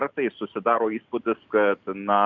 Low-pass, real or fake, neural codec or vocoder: 7.2 kHz; real; none